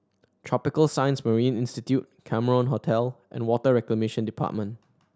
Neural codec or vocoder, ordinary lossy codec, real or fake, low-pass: none; none; real; none